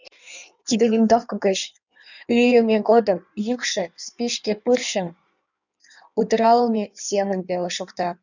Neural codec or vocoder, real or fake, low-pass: codec, 16 kHz in and 24 kHz out, 1.1 kbps, FireRedTTS-2 codec; fake; 7.2 kHz